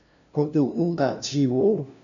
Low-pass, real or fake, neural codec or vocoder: 7.2 kHz; fake; codec, 16 kHz, 0.5 kbps, FunCodec, trained on LibriTTS, 25 frames a second